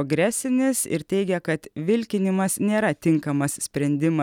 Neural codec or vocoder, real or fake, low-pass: none; real; 19.8 kHz